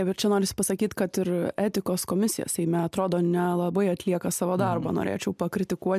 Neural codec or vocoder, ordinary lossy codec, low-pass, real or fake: none; MP3, 96 kbps; 14.4 kHz; real